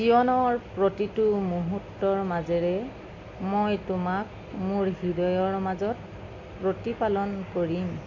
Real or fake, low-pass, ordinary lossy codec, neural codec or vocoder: real; 7.2 kHz; none; none